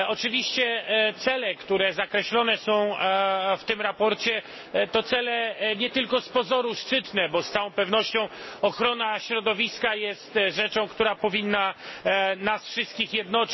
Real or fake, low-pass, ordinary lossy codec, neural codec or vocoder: real; 7.2 kHz; MP3, 24 kbps; none